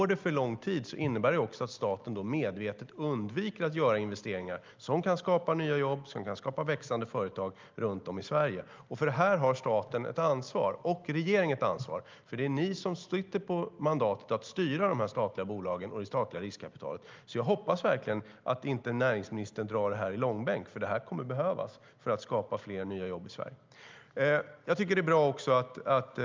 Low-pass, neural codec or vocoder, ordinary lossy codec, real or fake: 7.2 kHz; none; Opus, 24 kbps; real